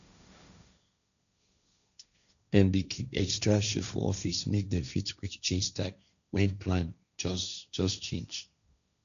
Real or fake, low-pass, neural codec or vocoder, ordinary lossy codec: fake; 7.2 kHz; codec, 16 kHz, 1.1 kbps, Voila-Tokenizer; none